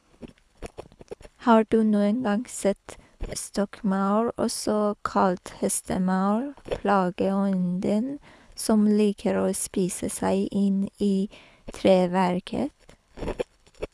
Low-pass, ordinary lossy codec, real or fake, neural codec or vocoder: none; none; fake; codec, 24 kHz, 6 kbps, HILCodec